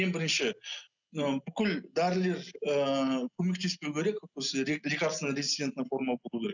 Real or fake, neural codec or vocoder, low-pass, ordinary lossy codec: real; none; 7.2 kHz; none